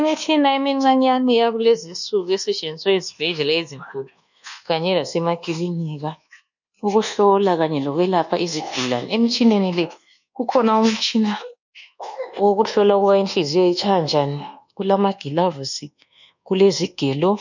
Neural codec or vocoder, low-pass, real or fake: codec, 24 kHz, 1.2 kbps, DualCodec; 7.2 kHz; fake